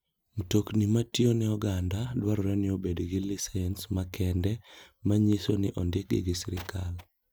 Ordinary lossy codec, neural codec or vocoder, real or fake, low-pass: none; none; real; none